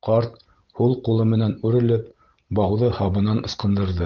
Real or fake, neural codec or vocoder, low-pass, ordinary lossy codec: fake; vocoder, 44.1 kHz, 128 mel bands every 512 samples, BigVGAN v2; 7.2 kHz; Opus, 16 kbps